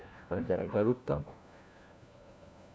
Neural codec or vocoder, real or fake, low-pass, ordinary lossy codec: codec, 16 kHz, 1 kbps, FunCodec, trained on LibriTTS, 50 frames a second; fake; none; none